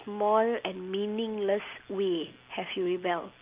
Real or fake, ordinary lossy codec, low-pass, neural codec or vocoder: real; Opus, 24 kbps; 3.6 kHz; none